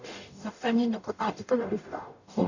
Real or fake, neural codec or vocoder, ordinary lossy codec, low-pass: fake; codec, 44.1 kHz, 0.9 kbps, DAC; none; 7.2 kHz